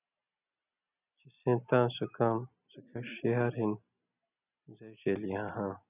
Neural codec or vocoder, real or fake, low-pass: none; real; 3.6 kHz